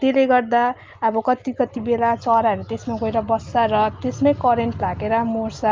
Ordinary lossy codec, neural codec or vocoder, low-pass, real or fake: Opus, 24 kbps; none; 7.2 kHz; real